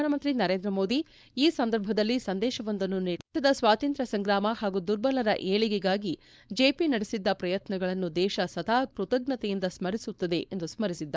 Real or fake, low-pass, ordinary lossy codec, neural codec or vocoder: fake; none; none; codec, 16 kHz, 4.8 kbps, FACodec